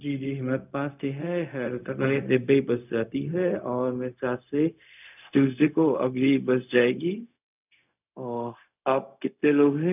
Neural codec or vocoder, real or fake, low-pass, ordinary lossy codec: codec, 16 kHz, 0.4 kbps, LongCat-Audio-Codec; fake; 3.6 kHz; none